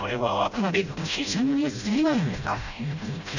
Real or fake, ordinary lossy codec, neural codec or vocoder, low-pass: fake; none; codec, 16 kHz, 0.5 kbps, FreqCodec, smaller model; 7.2 kHz